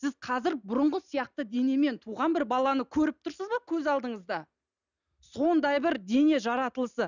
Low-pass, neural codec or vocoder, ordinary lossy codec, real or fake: 7.2 kHz; none; none; real